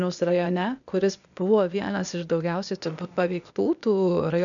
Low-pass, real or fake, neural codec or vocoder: 7.2 kHz; fake; codec, 16 kHz, 0.8 kbps, ZipCodec